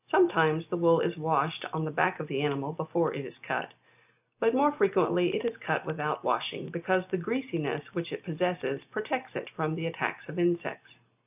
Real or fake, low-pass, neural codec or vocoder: real; 3.6 kHz; none